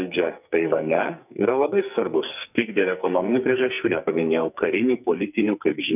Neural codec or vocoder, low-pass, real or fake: codec, 44.1 kHz, 2.6 kbps, SNAC; 3.6 kHz; fake